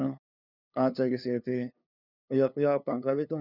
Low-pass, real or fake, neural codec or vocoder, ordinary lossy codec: 5.4 kHz; fake; codec, 16 kHz, 2 kbps, FunCodec, trained on LibriTTS, 25 frames a second; none